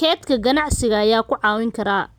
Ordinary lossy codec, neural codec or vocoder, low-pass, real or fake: none; none; none; real